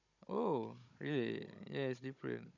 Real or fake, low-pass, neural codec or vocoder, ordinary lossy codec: fake; 7.2 kHz; codec, 16 kHz, 16 kbps, FunCodec, trained on Chinese and English, 50 frames a second; none